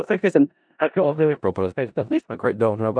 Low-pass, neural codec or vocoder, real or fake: 9.9 kHz; codec, 16 kHz in and 24 kHz out, 0.4 kbps, LongCat-Audio-Codec, four codebook decoder; fake